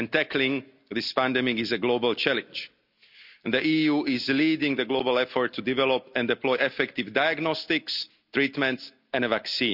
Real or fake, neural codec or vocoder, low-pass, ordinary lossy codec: real; none; 5.4 kHz; none